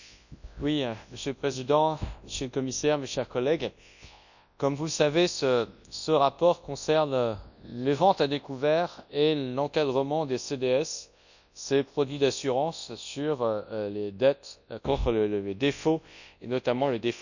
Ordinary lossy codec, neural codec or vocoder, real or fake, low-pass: none; codec, 24 kHz, 0.9 kbps, WavTokenizer, large speech release; fake; 7.2 kHz